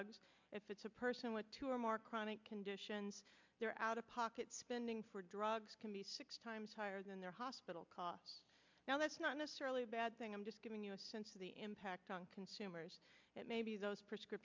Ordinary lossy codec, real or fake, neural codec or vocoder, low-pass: MP3, 64 kbps; real; none; 7.2 kHz